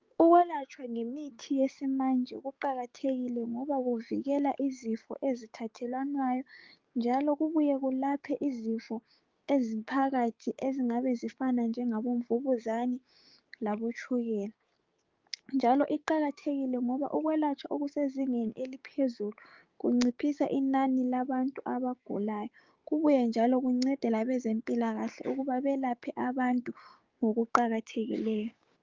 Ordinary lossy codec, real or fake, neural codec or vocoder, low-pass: Opus, 32 kbps; fake; codec, 16 kHz, 6 kbps, DAC; 7.2 kHz